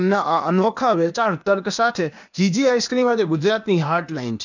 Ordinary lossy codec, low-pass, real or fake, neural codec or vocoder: none; 7.2 kHz; fake; codec, 16 kHz, 0.8 kbps, ZipCodec